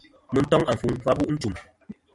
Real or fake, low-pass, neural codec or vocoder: fake; 10.8 kHz; vocoder, 44.1 kHz, 128 mel bands every 512 samples, BigVGAN v2